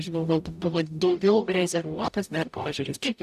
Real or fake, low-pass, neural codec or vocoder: fake; 14.4 kHz; codec, 44.1 kHz, 0.9 kbps, DAC